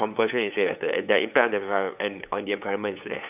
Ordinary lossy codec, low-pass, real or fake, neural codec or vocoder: none; 3.6 kHz; fake; codec, 16 kHz, 8 kbps, FunCodec, trained on LibriTTS, 25 frames a second